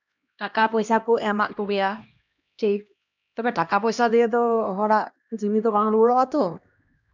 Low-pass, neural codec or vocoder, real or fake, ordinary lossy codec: 7.2 kHz; codec, 16 kHz, 1 kbps, X-Codec, HuBERT features, trained on LibriSpeech; fake; none